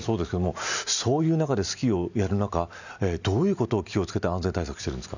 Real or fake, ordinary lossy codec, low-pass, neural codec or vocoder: real; none; 7.2 kHz; none